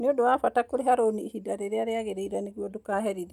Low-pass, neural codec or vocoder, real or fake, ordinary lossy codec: 19.8 kHz; none; real; none